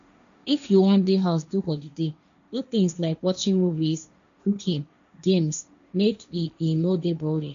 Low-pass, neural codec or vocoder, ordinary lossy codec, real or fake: 7.2 kHz; codec, 16 kHz, 1.1 kbps, Voila-Tokenizer; MP3, 96 kbps; fake